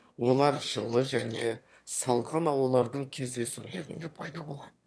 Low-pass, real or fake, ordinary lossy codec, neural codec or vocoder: none; fake; none; autoencoder, 22.05 kHz, a latent of 192 numbers a frame, VITS, trained on one speaker